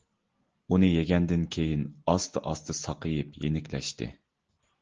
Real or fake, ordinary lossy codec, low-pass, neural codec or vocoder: real; Opus, 16 kbps; 7.2 kHz; none